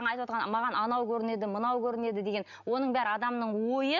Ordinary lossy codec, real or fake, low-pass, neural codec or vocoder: none; real; 7.2 kHz; none